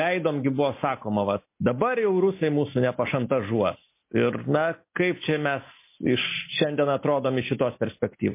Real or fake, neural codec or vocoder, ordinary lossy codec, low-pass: real; none; MP3, 24 kbps; 3.6 kHz